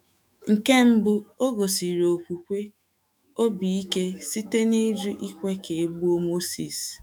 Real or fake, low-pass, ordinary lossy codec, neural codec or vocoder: fake; none; none; autoencoder, 48 kHz, 128 numbers a frame, DAC-VAE, trained on Japanese speech